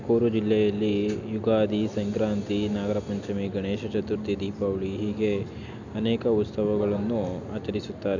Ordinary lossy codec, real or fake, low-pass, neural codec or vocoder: none; real; 7.2 kHz; none